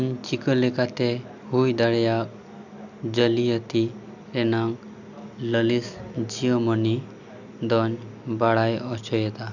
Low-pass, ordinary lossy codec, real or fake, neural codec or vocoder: 7.2 kHz; none; real; none